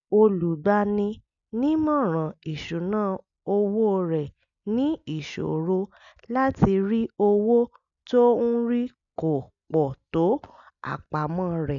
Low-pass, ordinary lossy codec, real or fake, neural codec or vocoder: 7.2 kHz; none; real; none